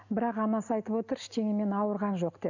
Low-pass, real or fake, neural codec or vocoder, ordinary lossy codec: 7.2 kHz; real; none; none